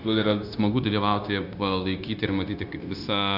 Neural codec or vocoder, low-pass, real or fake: codec, 16 kHz, 0.9 kbps, LongCat-Audio-Codec; 5.4 kHz; fake